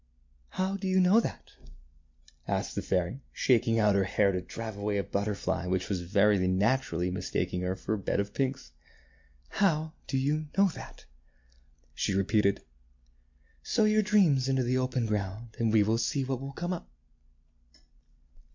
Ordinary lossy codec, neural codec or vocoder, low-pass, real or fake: MP3, 48 kbps; none; 7.2 kHz; real